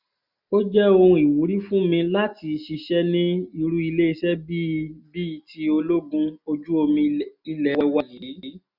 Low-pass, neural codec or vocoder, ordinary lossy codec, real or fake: 5.4 kHz; none; none; real